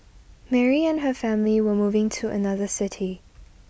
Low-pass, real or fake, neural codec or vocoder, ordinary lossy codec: none; real; none; none